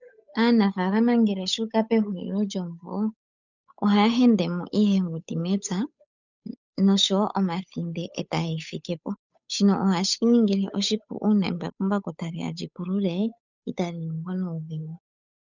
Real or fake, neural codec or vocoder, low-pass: fake; codec, 16 kHz, 8 kbps, FunCodec, trained on Chinese and English, 25 frames a second; 7.2 kHz